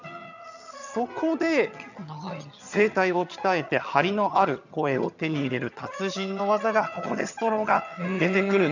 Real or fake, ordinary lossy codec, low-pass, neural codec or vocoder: fake; none; 7.2 kHz; vocoder, 22.05 kHz, 80 mel bands, HiFi-GAN